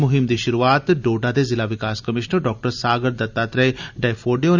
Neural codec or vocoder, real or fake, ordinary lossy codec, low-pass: none; real; none; 7.2 kHz